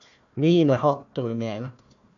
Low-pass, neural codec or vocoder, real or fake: 7.2 kHz; codec, 16 kHz, 1 kbps, FunCodec, trained on Chinese and English, 50 frames a second; fake